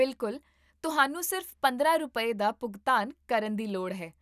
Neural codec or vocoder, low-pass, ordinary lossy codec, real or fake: none; 14.4 kHz; none; real